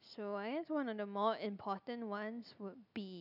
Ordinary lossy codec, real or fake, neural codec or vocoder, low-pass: none; real; none; 5.4 kHz